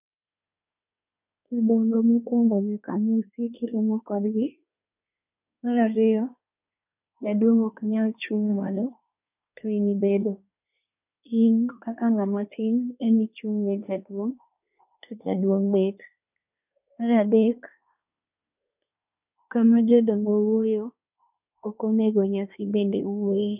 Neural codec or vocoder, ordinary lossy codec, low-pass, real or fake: codec, 24 kHz, 1 kbps, SNAC; none; 3.6 kHz; fake